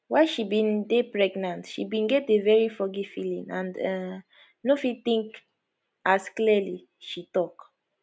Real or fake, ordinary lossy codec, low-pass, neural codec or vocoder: real; none; none; none